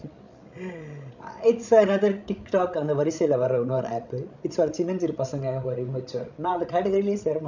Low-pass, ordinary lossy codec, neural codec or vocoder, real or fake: 7.2 kHz; none; codec, 16 kHz, 16 kbps, FreqCodec, larger model; fake